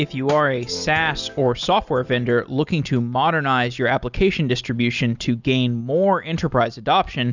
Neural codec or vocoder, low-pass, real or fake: none; 7.2 kHz; real